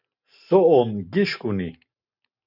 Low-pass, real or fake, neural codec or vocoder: 5.4 kHz; real; none